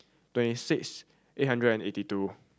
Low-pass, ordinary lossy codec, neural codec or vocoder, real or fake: none; none; none; real